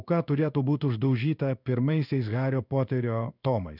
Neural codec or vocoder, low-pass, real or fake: codec, 16 kHz in and 24 kHz out, 1 kbps, XY-Tokenizer; 5.4 kHz; fake